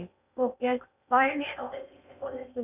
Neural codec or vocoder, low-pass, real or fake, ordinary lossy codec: codec, 16 kHz in and 24 kHz out, 0.6 kbps, FocalCodec, streaming, 2048 codes; 3.6 kHz; fake; none